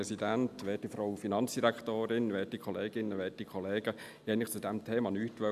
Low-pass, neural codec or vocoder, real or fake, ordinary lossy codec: 14.4 kHz; none; real; none